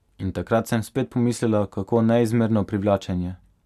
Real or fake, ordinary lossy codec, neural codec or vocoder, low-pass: real; none; none; 14.4 kHz